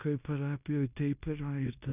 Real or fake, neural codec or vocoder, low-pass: fake; codec, 16 kHz in and 24 kHz out, 0.9 kbps, LongCat-Audio-Codec, fine tuned four codebook decoder; 3.6 kHz